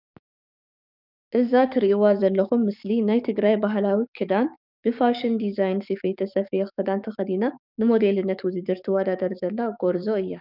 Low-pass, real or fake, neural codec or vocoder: 5.4 kHz; fake; codec, 44.1 kHz, 7.8 kbps, DAC